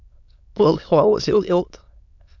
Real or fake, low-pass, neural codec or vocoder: fake; 7.2 kHz; autoencoder, 22.05 kHz, a latent of 192 numbers a frame, VITS, trained on many speakers